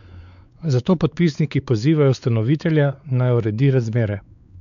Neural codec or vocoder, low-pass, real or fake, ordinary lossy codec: codec, 16 kHz, 4 kbps, X-Codec, WavLM features, trained on Multilingual LibriSpeech; 7.2 kHz; fake; none